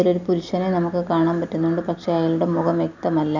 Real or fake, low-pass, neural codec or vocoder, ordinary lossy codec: real; 7.2 kHz; none; none